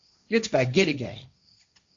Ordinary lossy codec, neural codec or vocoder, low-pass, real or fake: Opus, 64 kbps; codec, 16 kHz, 1.1 kbps, Voila-Tokenizer; 7.2 kHz; fake